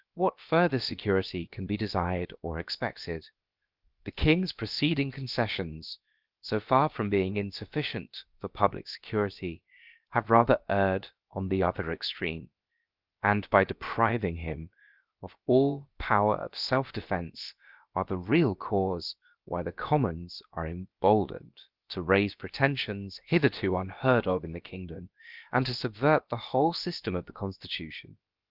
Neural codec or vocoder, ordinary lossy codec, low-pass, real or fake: codec, 16 kHz, about 1 kbps, DyCAST, with the encoder's durations; Opus, 32 kbps; 5.4 kHz; fake